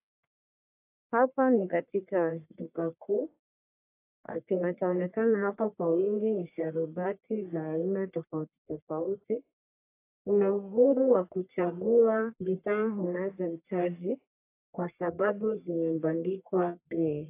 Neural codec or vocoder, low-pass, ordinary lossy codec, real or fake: codec, 44.1 kHz, 1.7 kbps, Pupu-Codec; 3.6 kHz; AAC, 24 kbps; fake